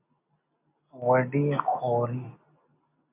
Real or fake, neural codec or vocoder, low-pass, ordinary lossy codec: real; none; 3.6 kHz; AAC, 32 kbps